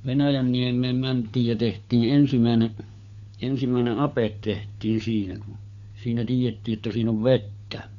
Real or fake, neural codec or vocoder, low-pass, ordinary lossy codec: fake; codec, 16 kHz, 4 kbps, FunCodec, trained on LibriTTS, 50 frames a second; 7.2 kHz; none